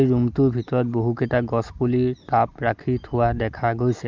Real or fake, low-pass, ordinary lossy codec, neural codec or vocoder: real; 7.2 kHz; Opus, 24 kbps; none